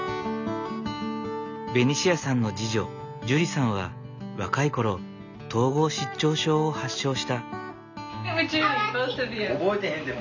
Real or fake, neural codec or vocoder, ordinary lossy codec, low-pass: real; none; none; 7.2 kHz